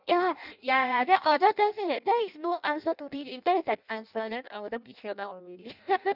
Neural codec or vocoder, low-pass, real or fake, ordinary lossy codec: codec, 16 kHz in and 24 kHz out, 0.6 kbps, FireRedTTS-2 codec; 5.4 kHz; fake; none